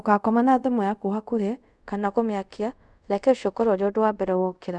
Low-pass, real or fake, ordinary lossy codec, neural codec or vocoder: none; fake; none; codec, 24 kHz, 0.5 kbps, DualCodec